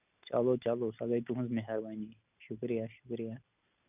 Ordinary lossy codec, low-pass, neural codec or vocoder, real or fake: none; 3.6 kHz; none; real